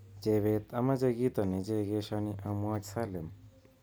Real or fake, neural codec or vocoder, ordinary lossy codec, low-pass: real; none; none; none